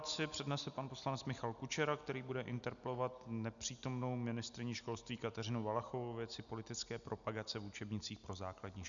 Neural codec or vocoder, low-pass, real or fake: none; 7.2 kHz; real